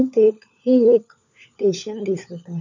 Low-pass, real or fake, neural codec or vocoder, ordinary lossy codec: 7.2 kHz; fake; codec, 16 kHz, 4 kbps, FunCodec, trained on LibriTTS, 50 frames a second; none